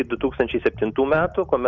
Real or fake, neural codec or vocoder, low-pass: real; none; 7.2 kHz